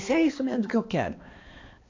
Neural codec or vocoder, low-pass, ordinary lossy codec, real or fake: codec, 16 kHz, 2 kbps, X-Codec, HuBERT features, trained on balanced general audio; 7.2 kHz; AAC, 32 kbps; fake